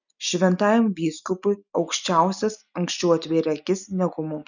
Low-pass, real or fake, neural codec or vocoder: 7.2 kHz; real; none